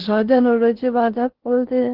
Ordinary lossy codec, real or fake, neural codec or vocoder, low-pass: Opus, 16 kbps; fake; codec, 16 kHz in and 24 kHz out, 0.6 kbps, FocalCodec, streaming, 4096 codes; 5.4 kHz